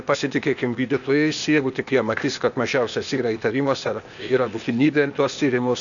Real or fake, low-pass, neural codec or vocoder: fake; 7.2 kHz; codec, 16 kHz, 0.8 kbps, ZipCodec